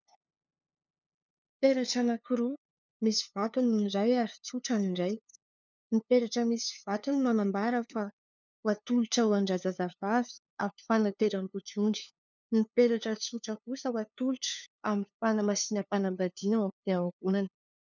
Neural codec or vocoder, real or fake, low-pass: codec, 16 kHz, 2 kbps, FunCodec, trained on LibriTTS, 25 frames a second; fake; 7.2 kHz